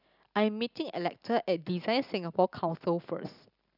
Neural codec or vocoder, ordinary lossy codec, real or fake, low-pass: none; none; real; 5.4 kHz